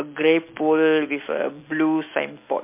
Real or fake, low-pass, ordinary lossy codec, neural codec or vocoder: real; 3.6 kHz; MP3, 24 kbps; none